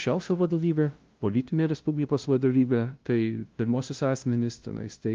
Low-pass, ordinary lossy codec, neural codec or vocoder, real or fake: 7.2 kHz; Opus, 32 kbps; codec, 16 kHz, 0.5 kbps, FunCodec, trained on LibriTTS, 25 frames a second; fake